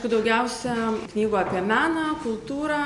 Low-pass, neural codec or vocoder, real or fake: 10.8 kHz; none; real